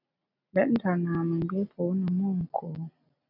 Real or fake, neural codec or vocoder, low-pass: real; none; 5.4 kHz